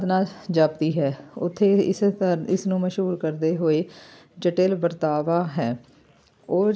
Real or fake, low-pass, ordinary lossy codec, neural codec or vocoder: real; none; none; none